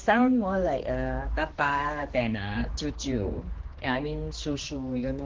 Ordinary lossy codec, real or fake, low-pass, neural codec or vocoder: Opus, 16 kbps; fake; 7.2 kHz; codec, 16 kHz, 2 kbps, X-Codec, HuBERT features, trained on general audio